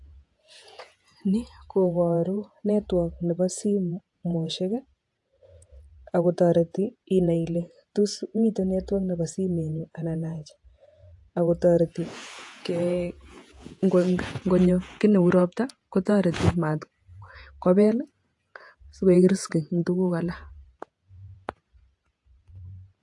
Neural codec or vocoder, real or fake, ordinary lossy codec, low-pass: vocoder, 48 kHz, 128 mel bands, Vocos; fake; AAC, 64 kbps; 10.8 kHz